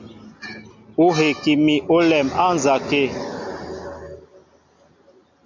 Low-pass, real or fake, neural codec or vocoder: 7.2 kHz; real; none